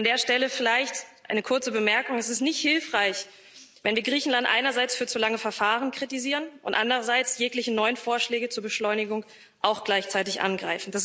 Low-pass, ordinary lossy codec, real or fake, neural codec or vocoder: none; none; real; none